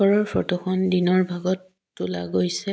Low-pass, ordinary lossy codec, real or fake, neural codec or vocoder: none; none; real; none